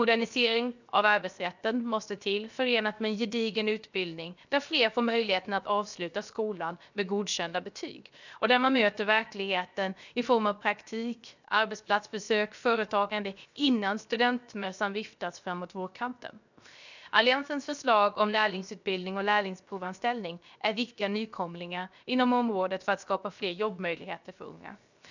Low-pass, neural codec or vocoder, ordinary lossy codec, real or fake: 7.2 kHz; codec, 16 kHz, 0.7 kbps, FocalCodec; none; fake